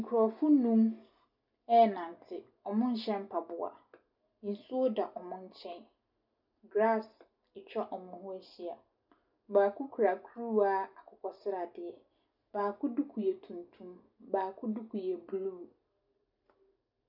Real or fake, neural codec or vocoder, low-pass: real; none; 5.4 kHz